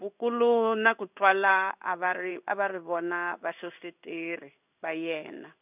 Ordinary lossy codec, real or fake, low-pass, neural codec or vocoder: none; fake; 3.6 kHz; codec, 16 kHz in and 24 kHz out, 1 kbps, XY-Tokenizer